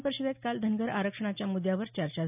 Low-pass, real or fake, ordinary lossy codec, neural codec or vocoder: 3.6 kHz; real; none; none